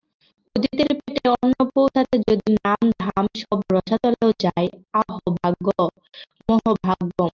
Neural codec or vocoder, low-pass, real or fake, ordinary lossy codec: none; 7.2 kHz; real; Opus, 32 kbps